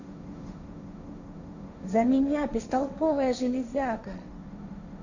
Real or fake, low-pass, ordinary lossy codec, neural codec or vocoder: fake; 7.2 kHz; none; codec, 16 kHz, 1.1 kbps, Voila-Tokenizer